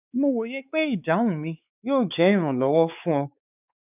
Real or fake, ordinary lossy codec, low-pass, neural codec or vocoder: fake; none; 3.6 kHz; codec, 16 kHz, 4 kbps, X-Codec, WavLM features, trained on Multilingual LibriSpeech